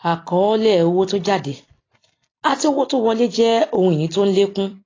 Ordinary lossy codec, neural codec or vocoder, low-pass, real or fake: AAC, 32 kbps; vocoder, 44.1 kHz, 128 mel bands every 256 samples, BigVGAN v2; 7.2 kHz; fake